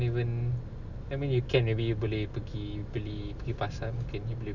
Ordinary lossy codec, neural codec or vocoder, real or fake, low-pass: none; none; real; 7.2 kHz